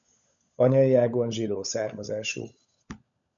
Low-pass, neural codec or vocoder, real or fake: 7.2 kHz; codec, 16 kHz, 16 kbps, FunCodec, trained on LibriTTS, 50 frames a second; fake